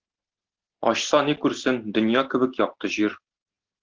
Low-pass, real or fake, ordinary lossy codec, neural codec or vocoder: 7.2 kHz; real; Opus, 16 kbps; none